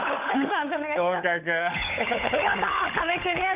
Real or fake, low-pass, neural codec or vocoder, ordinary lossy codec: fake; 3.6 kHz; codec, 16 kHz, 16 kbps, FunCodec, trained on LibriTTS, 50 frames a second; Opus, 64 kbps